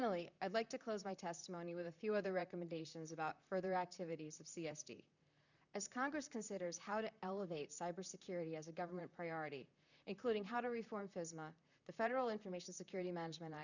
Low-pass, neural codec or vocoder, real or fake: 7.2 kHz; vocoder, 44.1 kHz, 128 mel bands, Pupu-Vocoder; fake